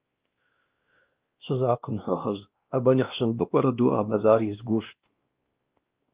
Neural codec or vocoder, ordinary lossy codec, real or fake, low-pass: codec, 16 kHz, 1 kbps, X-Codec, WavLM features, trained on Multilingual LibriSpeech; Opus, 32 kbps; fake; 3.6 kHz